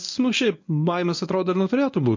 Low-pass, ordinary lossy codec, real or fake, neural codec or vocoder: 7.2 kHz; MP3, 48 kbps; fake; codec, 24 kHz, 0.9 kbps, WavTokenizer, medium speech release version 1